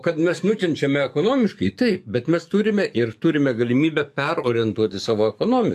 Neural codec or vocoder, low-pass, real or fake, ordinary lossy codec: codec, 44.1 kHz, 7.8 kbps, DAC; 14.4 kHz; fake; AAC, 96 kbps